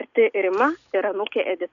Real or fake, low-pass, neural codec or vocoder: real; 7.2 kHz; none